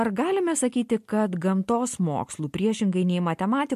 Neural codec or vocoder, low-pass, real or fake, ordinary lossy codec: none; 14.4 kHz; real; MP3, 64 kbps